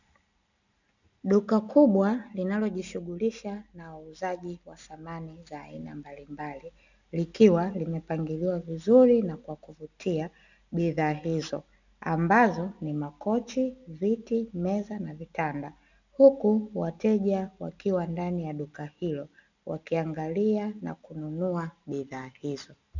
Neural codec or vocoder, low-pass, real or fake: none; 7.2 kHz; real